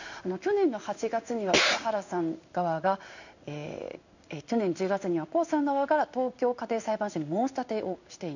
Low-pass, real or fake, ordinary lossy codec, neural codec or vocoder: 7.2 kHz; fake; none; codec, 16 kHz in and 24 kHz out, 1 kbps, XY-Tokenizer